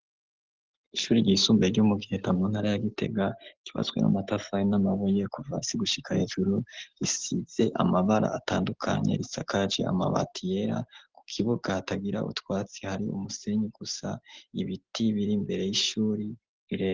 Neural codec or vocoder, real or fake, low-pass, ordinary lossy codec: none; real; 7.2 kHz; Opus, 16 kbps